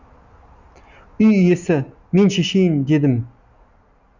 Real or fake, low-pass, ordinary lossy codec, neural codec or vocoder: real; 7.2 kHz; none; none